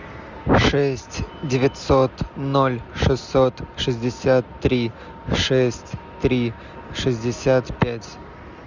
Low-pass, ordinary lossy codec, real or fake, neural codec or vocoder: 7.2 kHz; Opus, 64 kbps; real; none